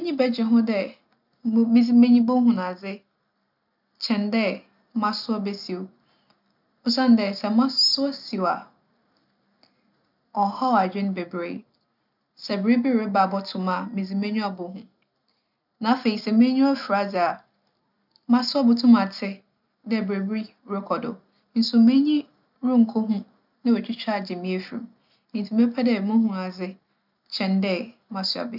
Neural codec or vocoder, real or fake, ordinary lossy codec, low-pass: none; real; none; 5.4 kHz